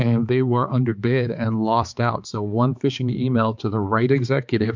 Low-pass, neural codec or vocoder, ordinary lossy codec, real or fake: 7.2 kHz; codec, 16 kHz, 4 kbps, X-Codec, HuBERT features, trained on balanced general audio; MP3, 64 kbps; fake